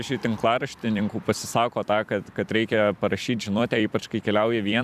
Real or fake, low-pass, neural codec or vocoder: fake; 14.4 kHz; vocoder, 44.1 kHz, 128 mel bands every 256 samples, BigVGAN v2